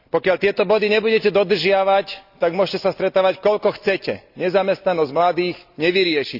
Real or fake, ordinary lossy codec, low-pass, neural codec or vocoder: real; none; 5.4 kHz; none